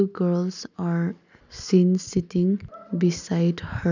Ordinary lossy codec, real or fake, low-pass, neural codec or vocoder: none; real; 7.2 kHz; none